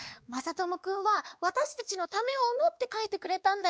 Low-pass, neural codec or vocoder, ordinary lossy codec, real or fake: none; codec, 16 kHz, 2 kbps, X-Codec, WavLM features, trained on Multilingual LibriSpeech; none; fake